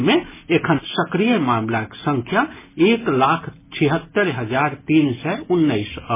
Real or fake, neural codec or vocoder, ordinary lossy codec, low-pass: real; none; MP3, 16 kbps; 3.6 kHz